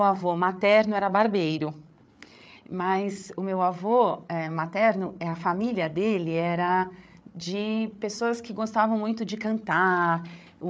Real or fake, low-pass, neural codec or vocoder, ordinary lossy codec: fake; none; codec, 16 kHz, 8 kbps, FreqCodec, larger model; none